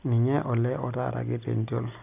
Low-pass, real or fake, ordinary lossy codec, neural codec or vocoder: 3.6 kHz; real; none; none